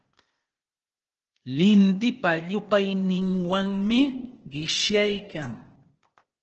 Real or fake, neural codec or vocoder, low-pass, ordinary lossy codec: fake; codec, 16 kHz, 0.8 kbps, ZipCodec; 7.2 kHz; Opus, 16 kbps